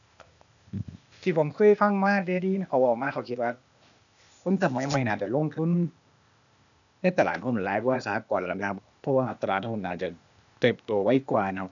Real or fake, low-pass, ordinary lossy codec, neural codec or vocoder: fake; 7.2 kHz; none; codec, 16 kHz, 0.8 kbps, ZipCodec